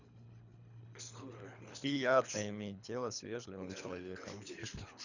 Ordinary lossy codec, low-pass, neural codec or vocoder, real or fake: none; 7.2 kHz; codec, 24 kHz, 3 kbps, HILCodec; fake